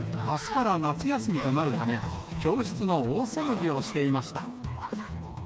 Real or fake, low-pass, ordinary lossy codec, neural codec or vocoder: fake; none; none; codec, 16 kHz, 2 kbps, FreqCodec, smaller model